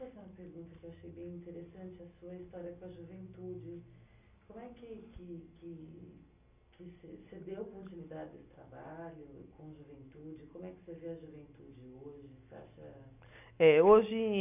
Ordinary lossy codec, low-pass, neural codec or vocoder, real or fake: none; 3.6 kHz; none; real